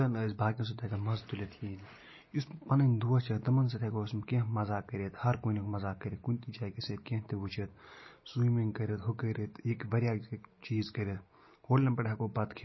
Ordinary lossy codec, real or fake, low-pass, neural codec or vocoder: MP3, 24 kbps; real; 7.2 kHz; none